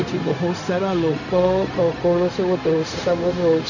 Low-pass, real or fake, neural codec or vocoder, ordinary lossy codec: 7.2 kHz; fake; codec, 16 kHz, 0.4 kbps, LongCat-Audio-Codec; none